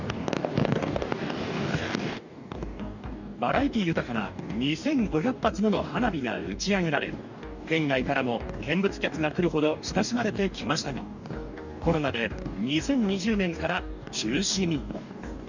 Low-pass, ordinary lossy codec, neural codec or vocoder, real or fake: 7.2 kHz; none; codec, 44.1 kHz, 2.6 kbps, DAC; fake